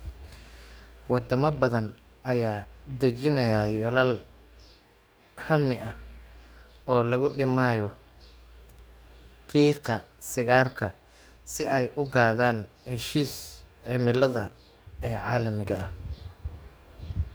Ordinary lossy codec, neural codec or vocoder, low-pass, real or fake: none; codec, 44.1 kHz, 2.6 kbps, DAC; none; fake